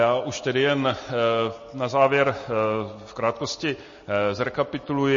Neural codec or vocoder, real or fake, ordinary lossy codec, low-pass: none; real; MP3, 32 kbps; 7.2 kHz